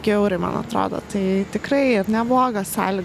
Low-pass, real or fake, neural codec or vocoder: 14.4 kHz; real; none